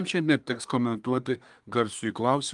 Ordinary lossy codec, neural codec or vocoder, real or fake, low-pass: Opus, 32 kbps; codec, 24 kHz, 1 kbps, SNAC; fake; 10.8 kHz